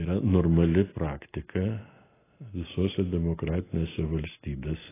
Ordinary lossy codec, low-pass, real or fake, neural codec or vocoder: AAC, 16 kbps; 3.6 kHz; real; none